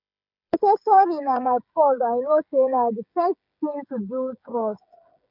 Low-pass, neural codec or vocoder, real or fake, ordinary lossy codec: 5.4 kHz; codec, 16 kHz, 16 kbps, FreqCodec, smaller model; fake; none